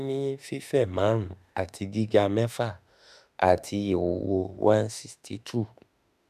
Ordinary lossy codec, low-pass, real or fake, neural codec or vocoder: none; 14.4 kHz; fake; autoencoder, 48 kHz, 32 numbers a frame, DAC-VAE, trained on Japanese speech